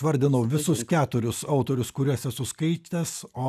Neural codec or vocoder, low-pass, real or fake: none; 14.4 kHz; real